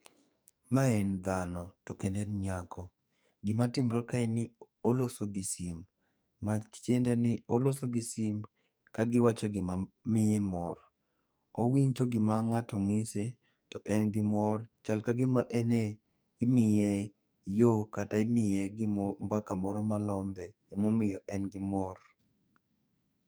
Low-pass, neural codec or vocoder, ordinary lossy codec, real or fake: none; codec, 44.1 kHz, 2.6 kbps, SNAC; none; fake